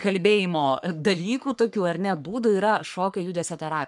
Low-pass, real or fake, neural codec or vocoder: 10.8 kHz; fake; codec, 44.1 kHz, 3.4 kbps, Pupu-Codec